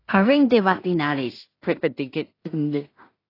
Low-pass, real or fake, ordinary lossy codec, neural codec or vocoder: 5.4 kHz; fake; AAC, 24 kbps; codec, 16 kHz in and 24 kHz out, 0.4 kbps, LongCat-Audio-Codec, two codebook decoder